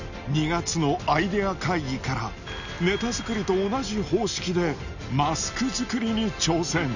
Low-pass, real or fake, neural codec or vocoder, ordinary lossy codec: 7.2 kHz; real; none; none